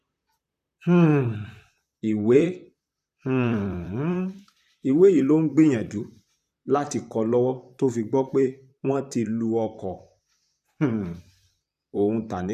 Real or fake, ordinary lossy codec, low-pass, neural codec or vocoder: fake; none; 14.4 kHz; vocoder, 44.1 kHz, 128 mel bands, Pupu-Vocoder